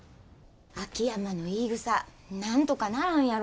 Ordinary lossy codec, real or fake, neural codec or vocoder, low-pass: none; real; none; none